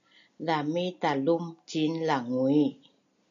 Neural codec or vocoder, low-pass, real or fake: none; 7.2 kHz; real